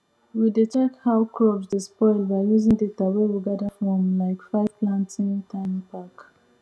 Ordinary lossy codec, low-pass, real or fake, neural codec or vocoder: none; none; real; none